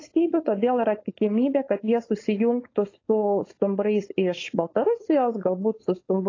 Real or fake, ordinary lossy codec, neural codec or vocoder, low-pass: fake; AAC, 32 kbps; codec, 16 kHz, 4.8 kbps, FACodec; 7.2 kHz